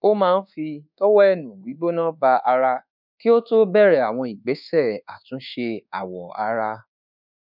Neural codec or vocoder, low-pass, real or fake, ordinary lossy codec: codec, 24 kHz, 1.2 kbps, DualCodec; 5.4 kHz; fake; none